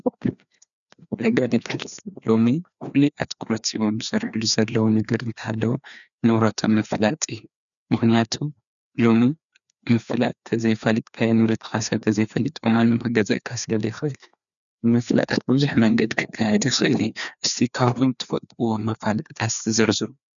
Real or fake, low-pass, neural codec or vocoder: fake; 7.2 kHz; codec, 16 kHz, 2 kbps, FreqCodec, larger model